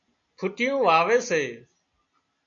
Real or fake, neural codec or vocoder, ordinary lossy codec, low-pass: real; none; MP3, 48 kbps; 7.2 kHz